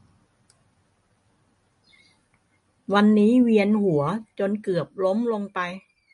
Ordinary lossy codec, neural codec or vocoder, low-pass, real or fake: MP3, 48 kbps; none; 10.8 kHz; real